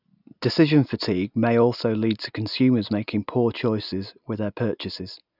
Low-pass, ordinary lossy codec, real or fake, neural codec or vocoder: 5.4 kHz; none; real; none